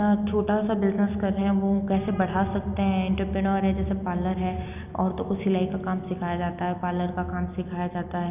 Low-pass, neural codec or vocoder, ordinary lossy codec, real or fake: 3.6 kHz; none; none; real